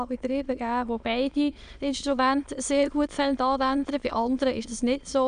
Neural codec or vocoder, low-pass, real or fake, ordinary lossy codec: autoencoder, 22.05 kHz, a latent of 192 numbers a frame, VITS, trained on many speakers; 9.9 kHz; fake; none